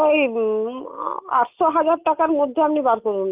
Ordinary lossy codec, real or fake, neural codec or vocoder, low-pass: Opus, 24 kbps; real; none; 3.6 kHz